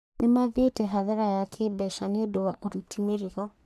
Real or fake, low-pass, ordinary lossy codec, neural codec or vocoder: fake; 14.4 kHz; none; codec, 44.1 kHz, 3.4 kbps, Pupu-Codec